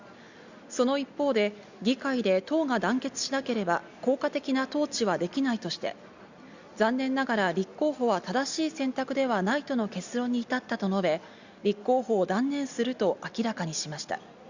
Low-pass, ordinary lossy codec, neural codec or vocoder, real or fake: 7.2 kHz; Opus, 64 kbps; none; real